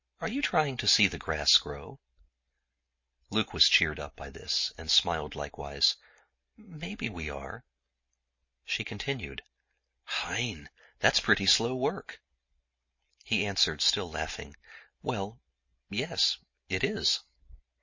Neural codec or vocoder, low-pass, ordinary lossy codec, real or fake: none; 7.2 kHz; MP3, 32 kbps; real